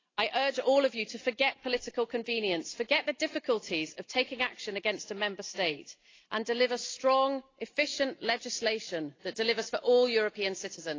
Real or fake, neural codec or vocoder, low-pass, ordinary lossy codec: real; none; 7.2 kHz; AAC, 32 kbps